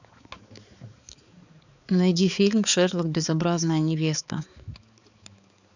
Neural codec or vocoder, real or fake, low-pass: codec, 16 kHz, 4 kbps, X-Codec, HuBERT features, trained on balanced general audio; fake; 7.2 kHz